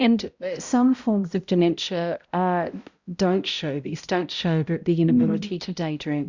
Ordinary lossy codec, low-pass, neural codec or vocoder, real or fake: Opus, 64 kbps; 7.2 kHz; codec, 16 kHz, 0.5 kbps, X-Codec, HuBERT features, trained on balanced general audio; fake